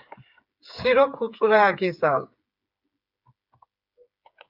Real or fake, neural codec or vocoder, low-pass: fake; codec, 16 kHz, 8 kbps, FreqCodec, smaller model; 5.4 kHz